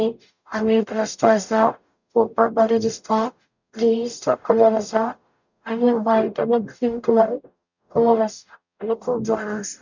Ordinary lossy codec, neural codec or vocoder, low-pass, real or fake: none; codec, 44.1 kHz, 0.9 kbps, DAC; 7.2 kHz; fake